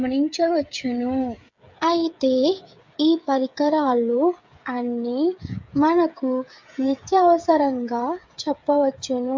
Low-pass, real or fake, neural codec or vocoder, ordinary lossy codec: 7.2 kHz; fake; codec, 16 kHz, 8 kbps, FreqCodec, smaller model; MP3, 64 kbps